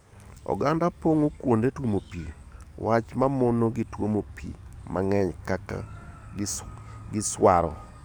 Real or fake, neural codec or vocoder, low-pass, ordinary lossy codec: fake; codec, 44.1 kHz, 7.8 kbps, DAC; none; none